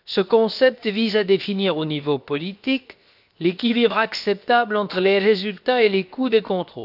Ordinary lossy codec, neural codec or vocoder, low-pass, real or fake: none; codec, 16 kHz, 0.7 kbps, FocalCodec; 5.4 kHz; fake